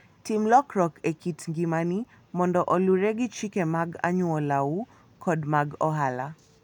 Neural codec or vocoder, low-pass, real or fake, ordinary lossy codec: none; 19.8 kHz; real; none